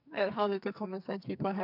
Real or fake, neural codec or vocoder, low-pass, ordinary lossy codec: fake; codec, 44.1 kHz, 2.6 kbps, SNAC; 5.4 kHz; none